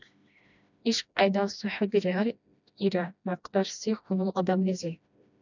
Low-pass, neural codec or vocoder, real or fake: 7.2 kHz; codec, 16 kHz, 1 kbps, FreqCodec, smaller model; fake